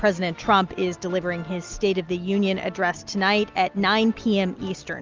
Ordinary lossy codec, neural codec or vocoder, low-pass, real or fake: Opus, 24 kbps; none; 7.2 kHz; real